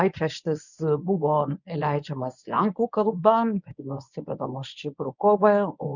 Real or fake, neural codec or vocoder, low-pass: fake; codec, 24 kHz, 0.9 kbps, WavTokenizer, medium speech release version 1; 7.2 kHz